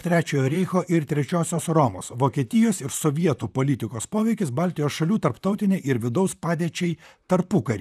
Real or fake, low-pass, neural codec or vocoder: fake; 14.4 kHz; vocoder, 44.1 kHz, 128 mel bands, Pupu-Vocoder